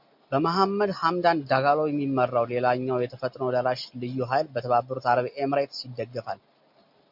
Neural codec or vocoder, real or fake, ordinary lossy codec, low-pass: none; real; MP3, 48 kbps; 5.4 kHz